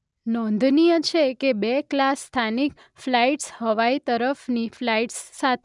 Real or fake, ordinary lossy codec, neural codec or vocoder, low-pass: real; none; none; 10.8 kHz